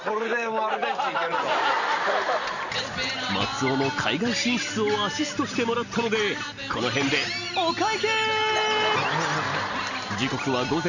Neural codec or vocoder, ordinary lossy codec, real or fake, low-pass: none; none; real; 7.2 kHz